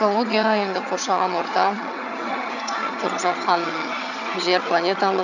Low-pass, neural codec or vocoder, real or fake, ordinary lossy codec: 7.2 kHz; vocoder, 22.05 kHz, 80 mel bands, HiFi-GAN; fake; none